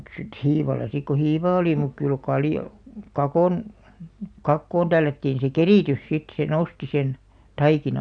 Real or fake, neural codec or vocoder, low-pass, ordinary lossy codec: real; none; 9.9 kHz; none